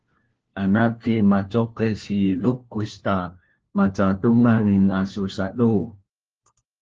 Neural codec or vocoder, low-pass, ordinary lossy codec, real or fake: codec, 16 kHz, 1 kbps, FunCodec, trained on LibriTTS, 50 frames a second; 7.2 kHz; Opus, 16 kbps; fake